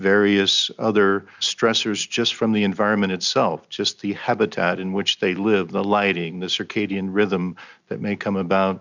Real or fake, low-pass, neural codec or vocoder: real; 7.2 kHz; none